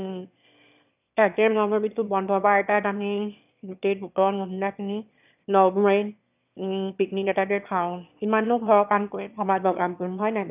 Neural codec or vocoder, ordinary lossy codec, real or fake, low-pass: autoencoder, 22.05 kHz, a latent of 192 numbers a frame, VITS, trained on one speaker; none; fake; 3.6 kHz